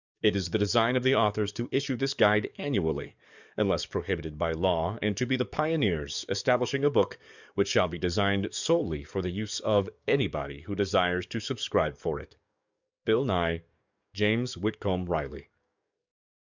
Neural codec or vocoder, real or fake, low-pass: codec, 44.1 kHz, 7.8 kbps, DAC; fake; 7.2 kHz